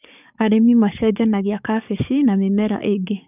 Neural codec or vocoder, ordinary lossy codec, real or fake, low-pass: codec, 44.1 kHz, 7.8 kbps, DAC; none; fake; 3.6 kHz